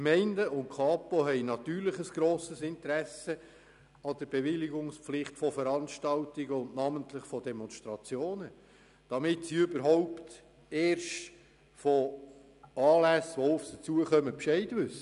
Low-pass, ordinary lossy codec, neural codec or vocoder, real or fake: 10.8 kHz; none; none; real